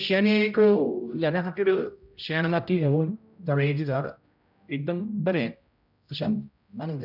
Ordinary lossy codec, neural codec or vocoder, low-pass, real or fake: none; codec, 16 kHz, 0.5 kbps, X-Codec, HuBERT features, trained on general audio; 5.4 kHz; fake